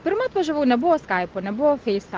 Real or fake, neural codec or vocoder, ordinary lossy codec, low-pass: real; none; Opus, 16 kbps; 7.2 kHz